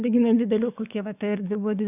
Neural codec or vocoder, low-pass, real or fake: codec, 44.1 kHz, 7.8 kbps, DAC; 3.6 kHz; fake